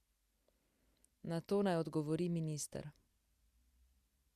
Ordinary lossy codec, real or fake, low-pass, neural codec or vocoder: Opus, 64 kbps; real; 14.4 kHz; none